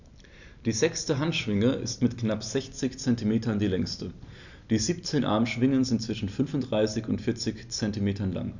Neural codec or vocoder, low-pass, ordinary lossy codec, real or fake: none; 7.2 kHz; none; real